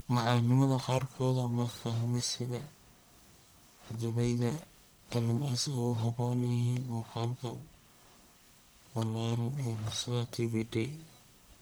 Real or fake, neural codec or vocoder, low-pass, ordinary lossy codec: fake; codec, 44.1 kHz, 1.7 kbps, Pupu-Codec; none; none